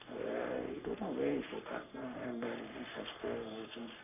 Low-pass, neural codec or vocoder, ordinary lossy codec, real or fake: 3.6 kHz; codec, 44.1 kHz, 3.4 kbps, Pupu-Codec; none; fake